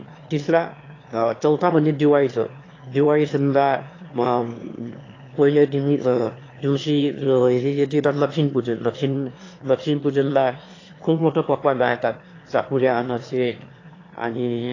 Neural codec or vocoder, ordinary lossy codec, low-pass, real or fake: autoencoder, 22.05 kHz, a latent of 192 numbers a frame, VITS, trained on one speaker; AAC, 32 kbps; 7.2 kHz; fake